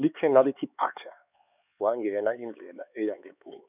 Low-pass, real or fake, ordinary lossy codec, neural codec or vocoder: 3.6 kHz; fake; none; codec, 16 kHz, 4 kbps, X-Codec, HuBERT features, trained on LibriSpeech